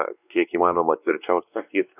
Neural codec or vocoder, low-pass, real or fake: codec, 16 kHz, 2 kbps, X-Codec, WavLM features, trained on Multilingual LibriSpeech; 3.6 kHz; fake